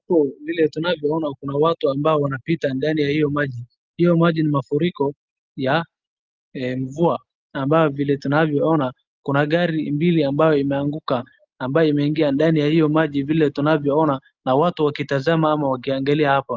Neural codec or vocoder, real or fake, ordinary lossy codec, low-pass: none; real; Opus, 24 kbps; 7.2 kHz